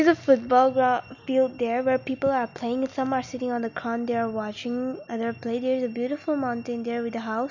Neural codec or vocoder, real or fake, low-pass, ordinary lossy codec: none; real; 7.2 kHz; none